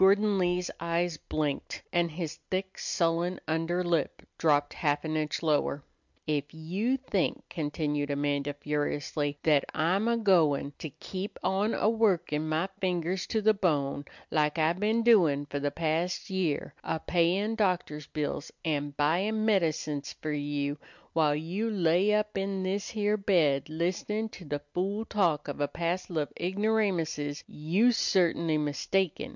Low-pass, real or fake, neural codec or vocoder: 7.2 kHz; real; none